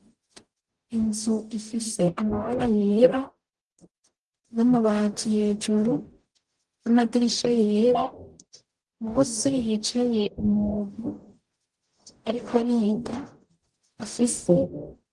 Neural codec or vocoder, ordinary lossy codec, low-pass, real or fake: codec, 44.1 kHz, 0.9 kbps, DAC; Opus, 24 kbps; 10.8 kHz; fake